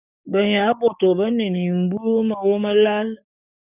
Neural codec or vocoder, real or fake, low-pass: codec, 44.1 kHz, 7.8 kbps, DAC; fake; 3.6 kHz